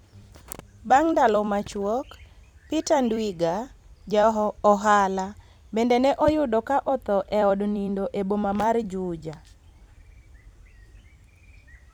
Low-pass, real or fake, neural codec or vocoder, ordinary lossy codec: 19.8 kHz; fake; vocoder, 44.1 kHz, 128 mel bands every 256 samples, BigVGAN v2; none